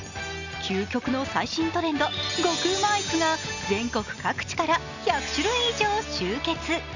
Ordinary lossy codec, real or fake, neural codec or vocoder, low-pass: none; real; none; 7.2 kHz